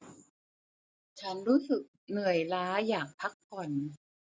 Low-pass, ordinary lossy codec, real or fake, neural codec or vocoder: none; none; real; none